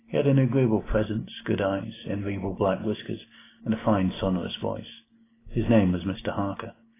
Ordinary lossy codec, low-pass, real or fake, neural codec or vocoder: AAC, 16 kbps; 3.6 kHz; real; none